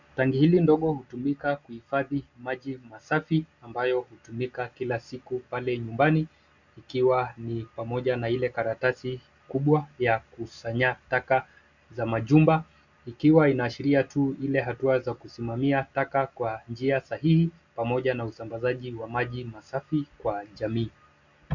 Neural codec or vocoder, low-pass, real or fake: none; 7.2 kHz; real